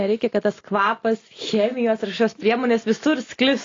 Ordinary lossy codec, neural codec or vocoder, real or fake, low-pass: AAC, 32 kbps; none; real; 7.2 kHz